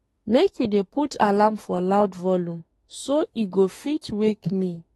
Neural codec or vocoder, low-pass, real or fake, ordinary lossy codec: autoencoder, 48 kHz, 32 numbers a frame, DAC-VAE, trained on Japanese speech; 19.8 kHz; fake; AAC, 32 kbps